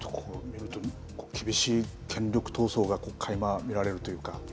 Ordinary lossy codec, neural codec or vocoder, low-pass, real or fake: none; none; none; real